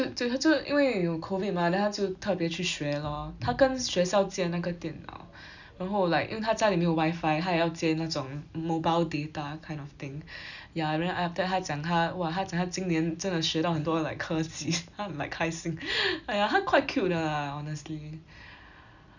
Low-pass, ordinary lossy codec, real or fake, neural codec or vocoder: 7.2 kHz; none; real; none